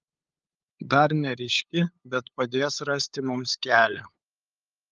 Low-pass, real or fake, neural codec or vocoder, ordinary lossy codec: 7.2 kHz; fake; codec, 16 kHz, 8 kbps, FunCodec, trained on LibriTTS, 25 frames a second; Opus, 32 kbps